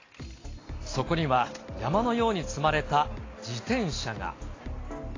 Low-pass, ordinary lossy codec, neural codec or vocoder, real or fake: 7.2 kHz; AAC, 32 kbps; none; real